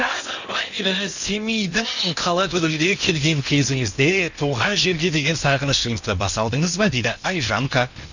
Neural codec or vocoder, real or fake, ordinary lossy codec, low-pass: codec, 16 kHz in and 24 kHz out, 0.8 kbps, FocalCodec, streaming, 65536 codes; fake; none; 7.2 kHz